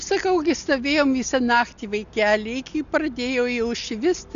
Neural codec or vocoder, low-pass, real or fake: none; 7.2 kHz; real